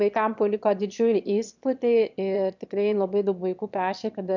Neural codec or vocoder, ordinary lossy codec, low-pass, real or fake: autoencoder, 22.05 kHz, a latent of 192 numbers a frame, VITS, trained on one speaker; MP3, 64 kbps; 7.2 kHz; fake